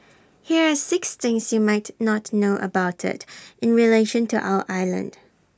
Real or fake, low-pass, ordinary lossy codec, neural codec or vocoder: real; none; none; none